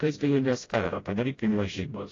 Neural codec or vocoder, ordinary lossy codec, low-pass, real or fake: codec, 16 kHz, 0.5 kbps, FreqCodec, smaller model; AAC, 32 kbps; 7.2 kHz; fake